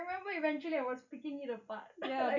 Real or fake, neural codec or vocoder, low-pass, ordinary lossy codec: real; none; 7.2 kHz; none